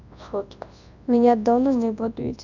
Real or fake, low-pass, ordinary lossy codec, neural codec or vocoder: fake; 7.2 kHz; none; codec, 24 kHz, 0.9 kbps, WavTokenizer, large speech release